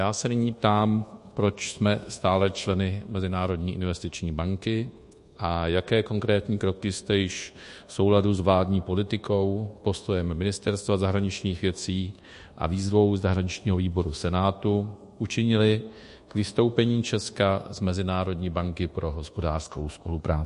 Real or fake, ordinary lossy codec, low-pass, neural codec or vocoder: fake; MP3, 48 kbps; 10.8 kHz; codec, 24 kHz, 1.2 kbps, DualCodec